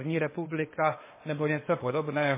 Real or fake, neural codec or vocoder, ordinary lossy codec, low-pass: fake; codec, 16 kHz, 0.8 kbps, ZipCodec; MP3, 16 kbps; 3.6 kHz